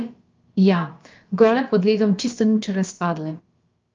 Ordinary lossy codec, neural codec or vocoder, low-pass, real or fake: Opus, 24 kbps; codec, 16 kHz, about 1 kbps, DyCAST, with the encoder's durations; 7.2 kHz; fake